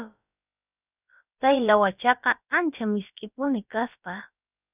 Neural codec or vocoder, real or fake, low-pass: codec, 16 kHz, about 1 kbps, DyCAST, with the encoder's durations; fake; 3.6 kHz